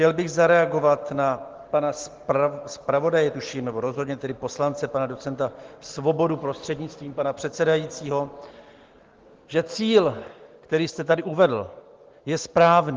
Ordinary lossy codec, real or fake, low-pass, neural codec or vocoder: Opus, 16 kbps; real; 7.2 kHz; none